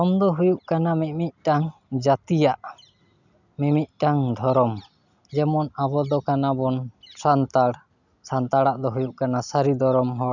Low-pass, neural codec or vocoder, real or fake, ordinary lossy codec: 7.2 kHz; none; real; none